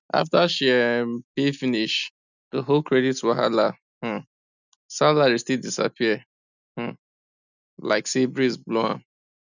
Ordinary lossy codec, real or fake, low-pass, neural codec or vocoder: none; real; 7.2 kHz; none